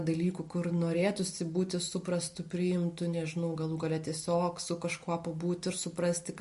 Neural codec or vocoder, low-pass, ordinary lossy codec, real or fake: none; 14.4 kHz; MP3, 48 kbps; real